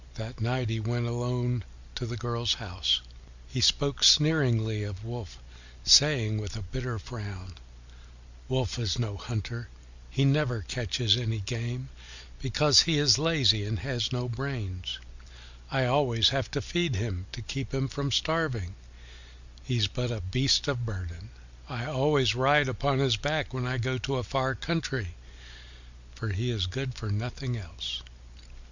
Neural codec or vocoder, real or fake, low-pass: none; real; 7.2 kHz